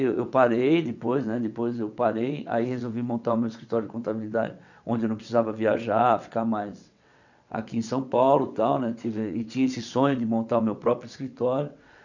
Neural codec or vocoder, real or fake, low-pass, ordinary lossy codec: vocoder, 22.05 kHz, 80 mel bands, WaveNeXt; fake; 7.2 kHz; none